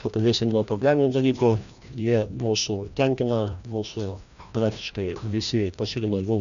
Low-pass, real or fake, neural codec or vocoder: 7.2 kHz; fake; codec, 16 kHz, 1 kbps, FreqCodec, larger model